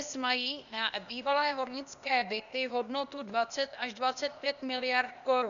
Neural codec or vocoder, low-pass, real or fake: codec, 16 kHz, 0.8 kbps, ZipCodec; 7.2 kHz; fake